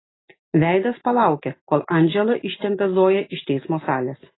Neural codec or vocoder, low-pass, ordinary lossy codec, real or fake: none; 7.2 kHz; AAC, 16 kbps; real